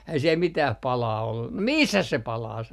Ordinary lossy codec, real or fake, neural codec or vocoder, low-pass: none; real; none; 14.4 kHz